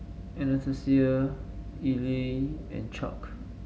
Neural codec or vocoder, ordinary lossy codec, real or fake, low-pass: none; none; real; none